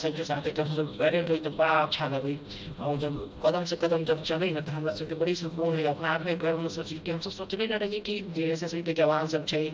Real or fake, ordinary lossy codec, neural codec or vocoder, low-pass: fake; none; codec, 16 kHz, 1 kbps, FreqCodec, smaller model; none